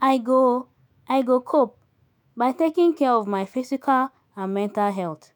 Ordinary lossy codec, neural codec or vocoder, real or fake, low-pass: none; autoencoder, 48 kHz, 128 numbers a frame, DAC-VAE, trained on Japanese speech; fake; none